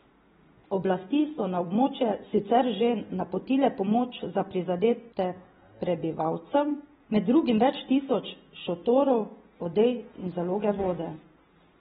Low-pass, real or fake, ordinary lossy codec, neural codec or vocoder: 19.8 kHz; real; AAC, 16 kbps; none